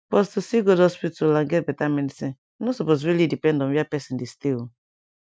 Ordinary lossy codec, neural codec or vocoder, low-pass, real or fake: none; none; none; real